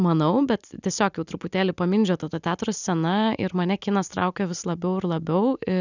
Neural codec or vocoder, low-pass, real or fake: none; 7.2 kHz; real